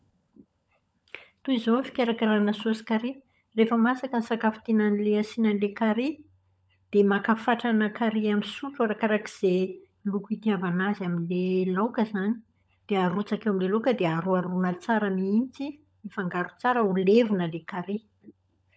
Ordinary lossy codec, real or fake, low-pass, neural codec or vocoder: none; fake; none; codec, 16 kHz, 16 kbps, FunCodec, trained on LibriTTS, 50 frames a second